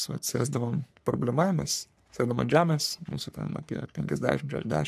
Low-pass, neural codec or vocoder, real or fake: 14.4 kHz; codec, 44.1 kHz, 3.4 kbps, Pupu-Codec; fake